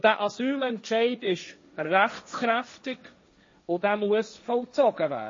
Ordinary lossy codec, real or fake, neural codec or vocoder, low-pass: MP3, 32 kbps; fake; codec, 16 kHz, 1.1 kbps, Voila-Tokenizer; 7.2 kHz